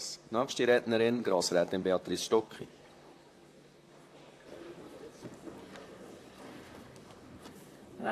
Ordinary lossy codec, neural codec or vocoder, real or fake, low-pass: AAC, 64 kbps; vocoder, 44.1 kHz, 128 mel bands, Pupu-Vocoder; fake; 14.4 kHz